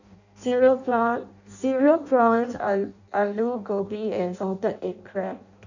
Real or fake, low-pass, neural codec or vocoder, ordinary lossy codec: fake; 7.2 kHz; codec, 16 kHz in and 24 kHz out, 0.6 kbps, FireRedTTS-2 codec; none